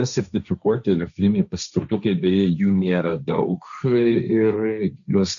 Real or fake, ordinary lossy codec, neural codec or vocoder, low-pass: fake; MP3, 96 kbps; codec, 16 kHz, 1.1 kbps, Voila-Tokenizer; 7.2 kHz